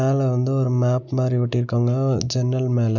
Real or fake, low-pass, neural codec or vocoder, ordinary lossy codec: real; 7.2 kHz; none; none